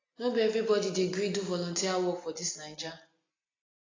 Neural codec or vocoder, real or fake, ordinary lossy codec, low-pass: none; real; AAC, 32 kbps; 7.2 kHz